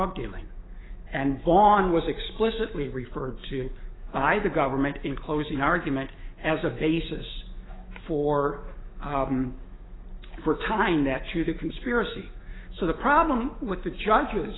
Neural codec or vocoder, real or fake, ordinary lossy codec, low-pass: autoencoder, 48 kHz, 128 numbers a frame, DAC-VAE, trained on Japanese speech; fake; AAC, 16 kbps; 7.2 kHz